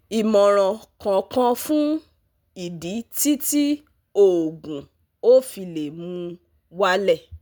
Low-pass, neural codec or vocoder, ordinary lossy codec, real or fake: none; none; none; real